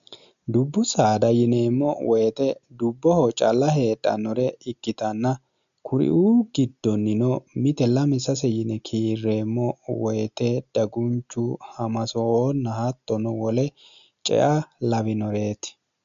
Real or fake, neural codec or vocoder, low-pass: real; none; 7.2 kHz